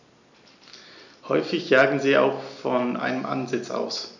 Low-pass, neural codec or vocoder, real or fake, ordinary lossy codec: 7.2 kHz; none; real; none